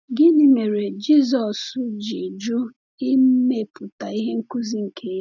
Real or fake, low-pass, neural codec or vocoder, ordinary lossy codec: real; 7.2 kHz; none; none